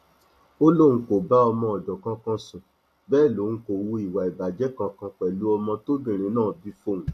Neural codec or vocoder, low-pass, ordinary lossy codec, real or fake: none; 14.4 kHz; AAC, 64 kbps; real